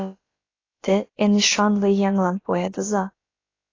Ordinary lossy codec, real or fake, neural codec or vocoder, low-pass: AAC, 32 kbps; fake; codec, 16 kHz, about 1 kbps, DyCAST, with the encoder's durations; 7.2 kHz